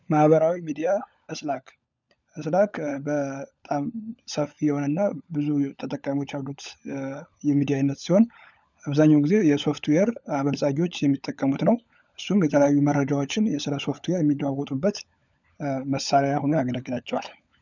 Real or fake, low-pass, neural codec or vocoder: fake; 7.2 kHz; codec, 16 kHz, 16 kbps, FunCodec, trained on LibriTTS, 50 frames a second